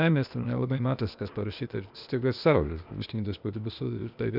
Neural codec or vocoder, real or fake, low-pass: codec, 16 kHz, 0.8 kbps, ZipCodec; fake; 5.4 kHz